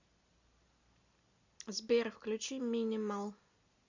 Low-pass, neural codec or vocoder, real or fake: 7.2 kHz; none; real